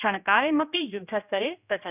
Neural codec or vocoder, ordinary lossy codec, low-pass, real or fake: codec, 16 kHz, 1 kbps, X-Codec, HuBERT features, trained on general audio; none; 3.6 kHz; fake